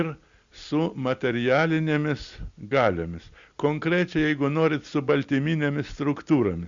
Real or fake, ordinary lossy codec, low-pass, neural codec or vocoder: real; Opus, 64 kbps; 7.2 kHz; none